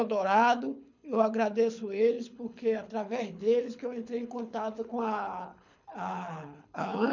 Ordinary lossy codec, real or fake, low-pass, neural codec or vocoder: none; fake; 7.2 kHz; codec, 24 kHz, 6 kbps, HILCodec